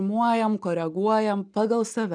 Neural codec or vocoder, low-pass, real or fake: none; 9.9 kHz; real